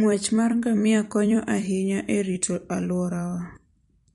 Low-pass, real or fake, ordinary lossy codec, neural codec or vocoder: 19.8 kHz; fake; MP3, 48 kbps; vocoder, 44.1 kHz, 128 mel bands every 256 samples, BigVGAN v2